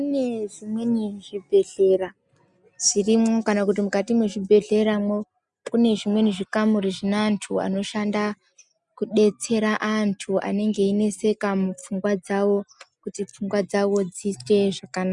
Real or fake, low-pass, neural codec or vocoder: real; 10.8 kHz; none